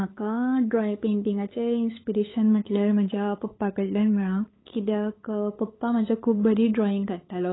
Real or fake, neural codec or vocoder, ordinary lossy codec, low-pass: fake; codec, 16 kHz, 8 kbps, FunCodec, trained on LibriTTS, 25 frames a second; AAC, 16 kbps; 7.2 kHz